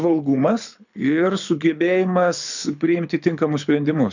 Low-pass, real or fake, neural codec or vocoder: 7.2 kHz; fake; codec, 24 kHz, 6 kbps, HILCodec